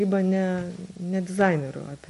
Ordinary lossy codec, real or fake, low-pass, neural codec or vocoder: MP3, 48 kbps; real; 14.4 kHz; none